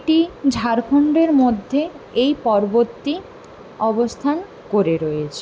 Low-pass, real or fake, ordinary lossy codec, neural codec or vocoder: none; real; none; none